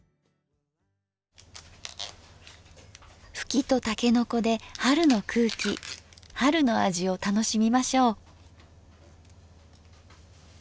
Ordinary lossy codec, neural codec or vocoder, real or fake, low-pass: none; none; real; none